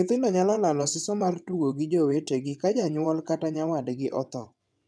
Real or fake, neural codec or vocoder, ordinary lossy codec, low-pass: fake; vocoder, 22.05 kHz, 80 mel bands, WaveNeXt; none; none